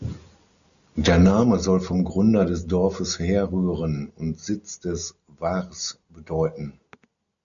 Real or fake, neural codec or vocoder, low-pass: real; none; 7.2 kHz